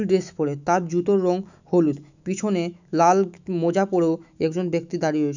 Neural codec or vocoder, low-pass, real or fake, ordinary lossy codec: autoencoder, 48 kHz, 128 numbers a frame, DAC-VAE, trained on Japanese speech; 7.2 kHz; fake; none